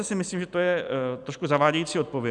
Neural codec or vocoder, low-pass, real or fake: none; 10.8 kHz; real